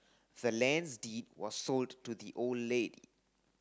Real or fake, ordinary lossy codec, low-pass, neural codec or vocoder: real; none; none; none